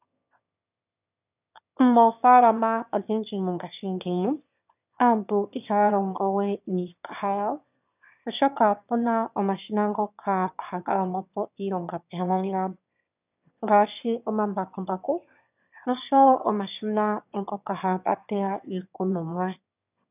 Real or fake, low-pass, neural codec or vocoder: fake; 3.6 kHz; autoencoder, 22.05 kHz, a latent of 192 numbers a frame, VITS, trained on one speaker